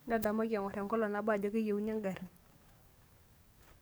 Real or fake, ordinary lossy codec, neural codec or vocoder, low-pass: fake; none; codec, 44.1 kHz, 7.8 kbps, DAC; none